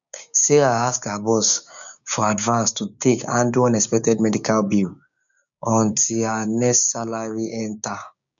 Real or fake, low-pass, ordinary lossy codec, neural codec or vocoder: fake; 7.2 kHz; none; codec, 16 kHz, 6 kbps, DAC